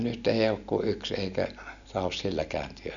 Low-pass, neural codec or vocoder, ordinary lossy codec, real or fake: 7.2 kHz; none; none; real